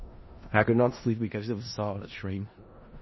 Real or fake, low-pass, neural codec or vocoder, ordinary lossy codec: fake; 7.2 kHz; codec, 16 kHz in and 24 kHz out, 0.4 kbps, LongCat-Audio-Codec, four codebook decoder; MP3, 24 kbps